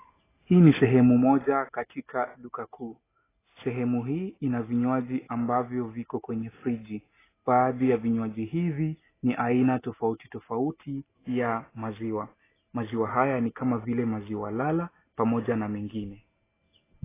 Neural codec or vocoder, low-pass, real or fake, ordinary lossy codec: none; 3.6 kHz; real; AAC, 16 kbps